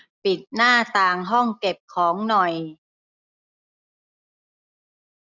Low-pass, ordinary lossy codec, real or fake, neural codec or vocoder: 7.2 kHz; none; real; none